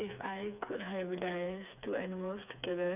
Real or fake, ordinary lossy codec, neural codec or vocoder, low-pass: fake; none; codec, 16 kHz, 4 kbps, FreqCodec, smaller model; 3.6 kHz